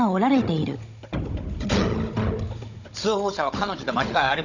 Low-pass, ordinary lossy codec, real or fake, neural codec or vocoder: 7.2 kHz; none; fake; codec, 16 kHz, 16 kbps, FunCodec, trained on Chinese and English, 50 frames a second